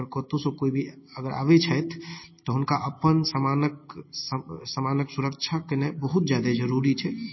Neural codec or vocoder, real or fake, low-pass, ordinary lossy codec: none; real; 7.2 kHz; MP3, 24 kbps